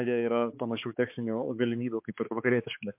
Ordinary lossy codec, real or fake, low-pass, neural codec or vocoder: MP3, 32 kbps; fake; 3.6 kHz; codec, 16 kHz, 4 kbps, X-Codec, HuBERT features, trained on balanced general audio